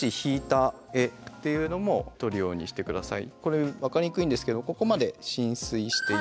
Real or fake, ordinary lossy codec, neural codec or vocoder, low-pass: real; none; none; none